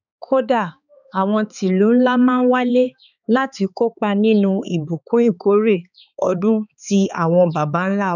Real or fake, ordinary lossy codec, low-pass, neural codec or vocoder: fake; none; 7.2 kHz; codec, 16 kHz, 4 kbps, X-Codec, HuBERT features, trained on balanced general audio